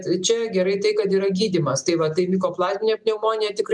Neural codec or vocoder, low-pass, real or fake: none; 10.8 kHz; real